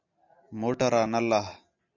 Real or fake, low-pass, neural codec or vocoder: real; 7.2 kHz; none